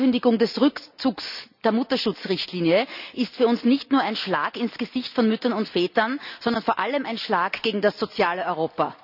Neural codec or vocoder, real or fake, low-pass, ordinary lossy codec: none; real; 5.4 kHz; none